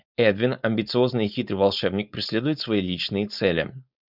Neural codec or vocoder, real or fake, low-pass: codec, 16 kHz, 4.8 kbps, FACodec; fake; 5.4 kHz